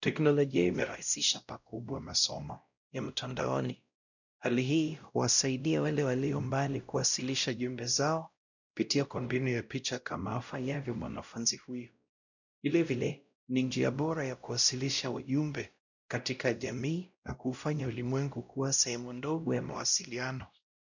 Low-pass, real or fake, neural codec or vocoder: 7.2 kHz; fake; codec, 16 kHz, 0.5 kbps, X-Codec, WavLM features, trained on Multilingual LibriSpeech